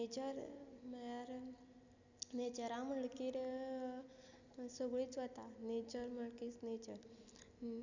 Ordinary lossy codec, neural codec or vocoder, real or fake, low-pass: none; none; real; 7.2 kHz